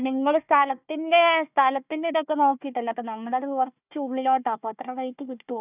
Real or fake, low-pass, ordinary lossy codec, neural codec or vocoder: fake; 3.6 kHz; none; codec, 16 kHz, 1 kbps, FunCodec, trained on Chinese and English, 50 frames a second